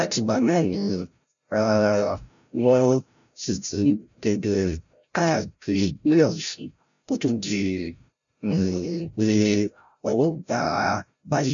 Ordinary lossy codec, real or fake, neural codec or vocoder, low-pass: none; fake; codec, 16 kHz, 0.5 kbps, FreqCodec, larger model; 7.2 kHz